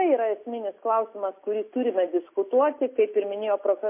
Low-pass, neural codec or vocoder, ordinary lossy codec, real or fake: 3.6 kHz; none; MP3, 24 kbps; real